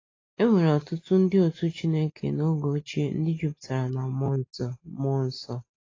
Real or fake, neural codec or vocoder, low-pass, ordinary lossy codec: real; none; 7.2 kHz; AAC, 32 kbps